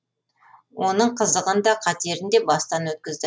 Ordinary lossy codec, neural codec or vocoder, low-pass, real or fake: none; none; none; real